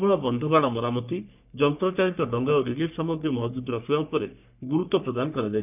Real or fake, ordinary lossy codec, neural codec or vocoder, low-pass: fake; none; codec, 44.1 kHz, 3.4 kbps, Pupu-Codec; 3.6 kHz